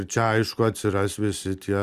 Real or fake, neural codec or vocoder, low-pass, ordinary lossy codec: real; none; 14.4 kHz; Opus, 64 kbps